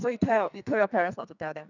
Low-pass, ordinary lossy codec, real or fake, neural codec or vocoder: 7.2 kHz; none; fake; codec, 44.1 kHz, 2.6 kbps, SNAC